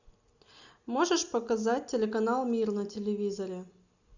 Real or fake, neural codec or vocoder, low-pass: real; none; 7.2 kHz